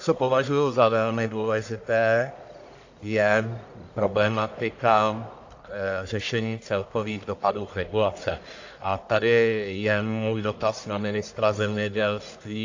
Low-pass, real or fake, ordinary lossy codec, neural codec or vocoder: 7.2 kHz; fake; AAC, 48 kbps; codec, 44.1 kHz, 1.7 kbps, Pupu-Codec